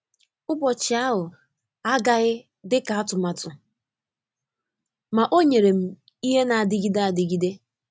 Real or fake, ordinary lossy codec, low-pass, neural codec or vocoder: real; none; none; none